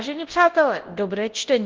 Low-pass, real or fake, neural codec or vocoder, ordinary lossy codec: 7.2 kHz; fake; codec, 24 kHz, 0.9 kbps, WavTokenizer, large speech release; Opus, 16 kbps